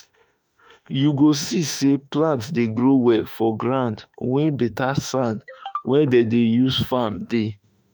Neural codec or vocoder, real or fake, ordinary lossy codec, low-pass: autoencoder, 48 kHz, 32 numbers a frame, DAC-VAE, trained on Japanese speech; fake; none; none